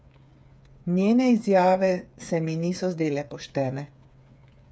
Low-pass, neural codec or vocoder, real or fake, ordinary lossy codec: none; codec, 16 kHz, 8 kbps, FreqCodec, smaller model; fake; none